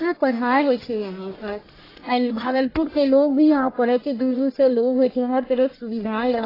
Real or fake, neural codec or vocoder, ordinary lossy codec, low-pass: fake; codec, 44.1 kHz, 1.7 kbps, Pupu-Codec; AAC, 24 kbps; 5.4 kHz